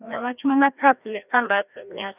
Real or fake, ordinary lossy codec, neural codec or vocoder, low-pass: fake; none; codec, 16 kHz, 1 kbps, FreqCodec, larger model; 3.6 kHz